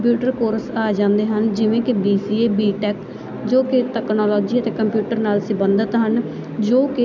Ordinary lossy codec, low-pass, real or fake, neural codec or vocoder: none; 7.2 kHz; real; none